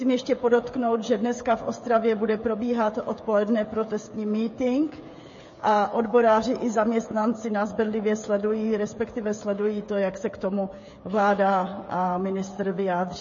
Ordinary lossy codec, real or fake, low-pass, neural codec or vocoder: MP3, 32 kbps; fake; 7.2 kHz; codec, 16 kHz, 16 kbps, FreqCodec, smaller model